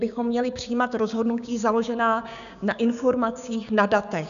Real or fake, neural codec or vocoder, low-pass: fake; codec, 16 kHz, 6 kbps, DAC; 7.2 kHz